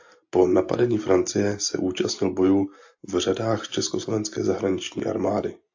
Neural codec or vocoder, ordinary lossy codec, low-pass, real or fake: none; AAC, 48 kbps; 7.2 kHz; real